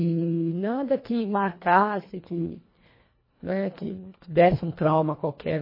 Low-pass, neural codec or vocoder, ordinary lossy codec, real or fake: 5.4 kHz; codec, 24 kHz, 1.5 kbps, HILCodec; MP3, 24 kbps; fake